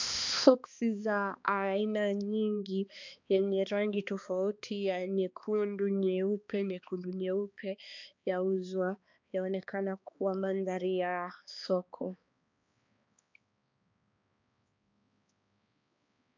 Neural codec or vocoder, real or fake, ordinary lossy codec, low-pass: codec, 16 kHz, 2 kbps, X-Codec, HuBERT features, trained on balanced general audio; fake; MP3, 64 kbps; 7.2 kHz